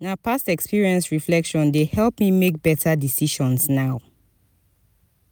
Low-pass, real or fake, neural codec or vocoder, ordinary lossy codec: none; real; none; none